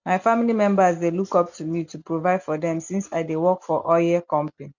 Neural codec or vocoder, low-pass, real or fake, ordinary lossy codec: none; 7.2 kHz; real; none